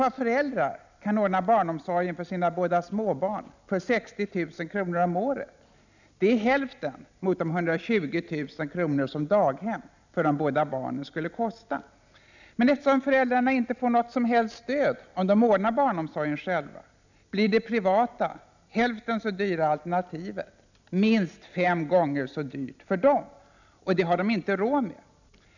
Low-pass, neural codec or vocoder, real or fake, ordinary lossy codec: 7.2 kHz; none; real; none